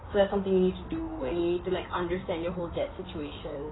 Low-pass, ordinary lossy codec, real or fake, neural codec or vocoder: 7.2 kHz; AAC, 16 kbps; fake; codec, 16 kHz in and 24 kHz out, 2.2 kbps, FireRedTTS-2 codec